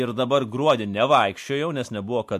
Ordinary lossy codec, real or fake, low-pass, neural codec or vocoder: MP3, 64 kbps; real; 14.4 kHz; none